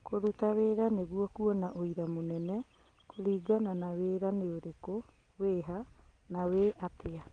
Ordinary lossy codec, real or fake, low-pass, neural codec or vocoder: Opus, 24 kbps; real; 9.9 kHz; none